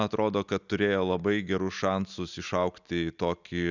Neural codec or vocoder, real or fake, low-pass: none; real; 7.2 kHz